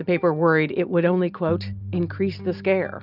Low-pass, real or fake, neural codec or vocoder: 5.4 kHz; real; none